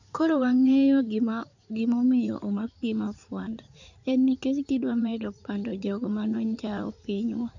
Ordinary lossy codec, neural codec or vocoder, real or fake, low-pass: none; codec, 16 kHz in and 24 kHz out, 2.2 kbps, FireRedTTS-2 codec; fake; 7.2 kHz